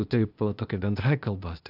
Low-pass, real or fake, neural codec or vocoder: 5.4 kHz; fake; codec, 16 kHz, 0.8 kbps, ZipCodec